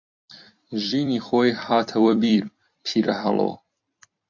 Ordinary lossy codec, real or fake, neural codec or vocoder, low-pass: MP3, 48 kbps; fake; vocoder, 44.1 kHz, 128 mel bands every 256 samples, BigVGAN v2; 7.2 kHz